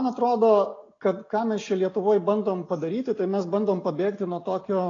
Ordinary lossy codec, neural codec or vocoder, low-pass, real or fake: AAC, 32 kbps; none; 7.2 kHz; real